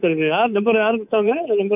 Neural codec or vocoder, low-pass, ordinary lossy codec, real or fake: codec, 24 kHz, 3.1 kbps, DualCodec; 3.6 kHz; none; fake